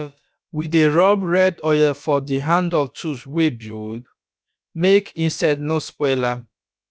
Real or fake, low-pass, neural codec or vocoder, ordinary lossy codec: fake; none; codec, 16 kHz, about 1 kbps, DyCAST, with the encoder's durations; none